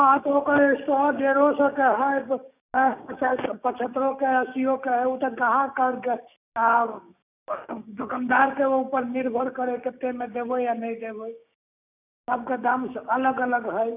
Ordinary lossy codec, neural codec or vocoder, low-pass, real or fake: none; codec, 16 kHz, 6 kbps, DAC; 3.6 kHz; fake